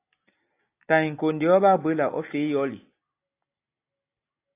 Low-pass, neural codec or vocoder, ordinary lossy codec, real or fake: 3.6 kHz; none; AAC, 24 kbps; real